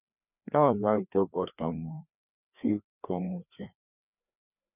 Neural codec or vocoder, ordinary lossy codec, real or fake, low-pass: codec, 16 kHz, 2 kbps, FreqCodec, larger model; none; fake; 3.6 kHz